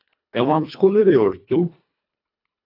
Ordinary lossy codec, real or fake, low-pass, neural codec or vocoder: AAC, 48 kbps; fake; 5.4 kHz; codec, 24 kHz, 1.5 kbps, HILCodec